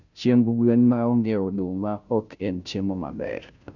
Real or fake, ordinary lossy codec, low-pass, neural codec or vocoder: fake; none; 7.2 kHz; codec, 16 kHz, 0.5 kbps, FunCodec, trained on Chinese and English, 25 frames a second